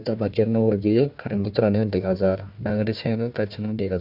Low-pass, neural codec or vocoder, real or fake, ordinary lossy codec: 5.4 kHz; codec, 16 kHz, 1 kbps, FunCodec, trained on Chinese and English, 50 frames a second; fake; none